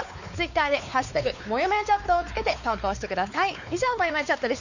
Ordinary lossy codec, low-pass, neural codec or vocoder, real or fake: none; 7.2 kHz; codec, 16 kHz, 4 kbps, X-Codec, WavLM features, trained on Multilingual LibriSpeech; fake